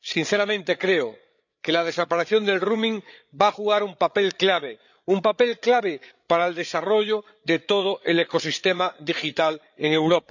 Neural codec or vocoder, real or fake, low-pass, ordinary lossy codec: codec, 16 kHz, 8 kbps, FreqCodec, larger model; fake; 7.2 kHz; none